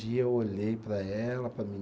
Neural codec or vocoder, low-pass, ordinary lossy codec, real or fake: none; none; none; real